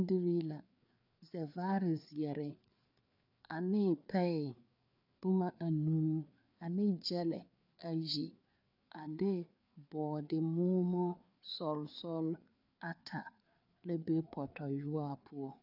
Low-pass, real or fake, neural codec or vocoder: 5.4 kHz; fake; codec, 16 kHz, 4 kbps, FreqCodec, larger model